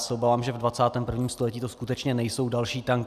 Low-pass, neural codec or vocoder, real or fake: 14.4 kHz; none; real